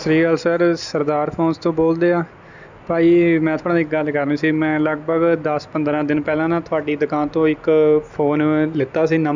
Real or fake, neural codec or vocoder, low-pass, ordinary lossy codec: real; none; 7.2 kHz; none